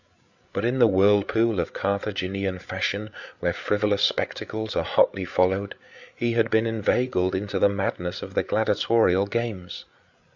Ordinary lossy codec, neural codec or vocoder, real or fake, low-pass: Opus, 64 kbps; codec, 16 kHz, 8 kbps, FreqCodec, larger model; fake; 7.2 kHz